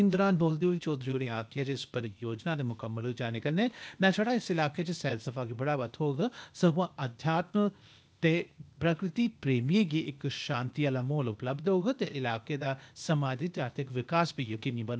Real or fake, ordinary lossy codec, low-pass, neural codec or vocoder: fake; none; none; codec, 16 kHz, 0.8 kbps, ZipCodec